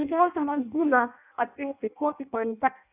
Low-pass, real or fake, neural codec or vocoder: 3.6 kHz; fake; codec, 16 kHz in and 24 kHz out, 0.6 kbps, FireRedTTS-2 codec